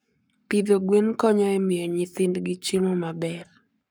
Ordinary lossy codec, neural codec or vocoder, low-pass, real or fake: none; codec, 44.1 kHz, 7.8 kbps, Pupu-Codec; none; fake